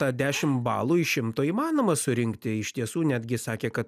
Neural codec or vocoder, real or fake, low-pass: none; real; 14.4 kHz